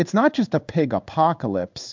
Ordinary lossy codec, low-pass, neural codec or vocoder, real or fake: MP3, 64 kbps; 7.2 kHz; codec, 16 kHz in and 24 kHz out, 1 kbps, XY-Tokenizer; fake